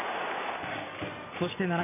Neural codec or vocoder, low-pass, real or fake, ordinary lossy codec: vocoder, 44.1 kHz, 128 mel bands every 256 samples, BigVGAN v2; 3.6 kHz; fake; none